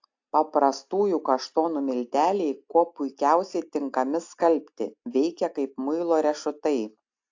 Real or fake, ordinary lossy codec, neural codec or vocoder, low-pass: real; AAC, 48 kbps; none; 7.2 kHz